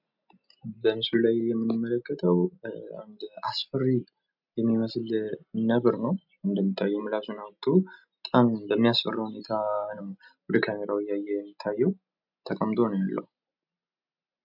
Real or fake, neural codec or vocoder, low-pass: real; none; 5.4 kHz